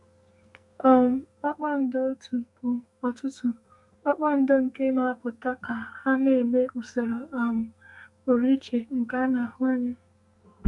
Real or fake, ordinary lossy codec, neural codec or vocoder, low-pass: fake; none; codec, 44.1 kHz, 2.6 kbps, SNAC; 10.8 kHz